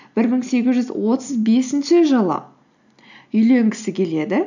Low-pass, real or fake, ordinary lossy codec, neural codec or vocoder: 7.2 kHz; real; none; none